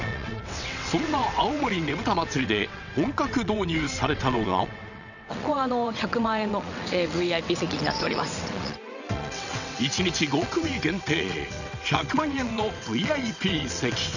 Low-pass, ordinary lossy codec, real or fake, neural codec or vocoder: 7.2 kHz; none; fake; vocoder, 22.05 kHz, 80 mel bands, WaveNeXt